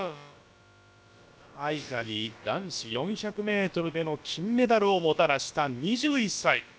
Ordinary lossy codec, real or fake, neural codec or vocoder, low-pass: none; fake; codec, 16 kHz, about 1 kbps, DyCAST, with the encoder's durations; none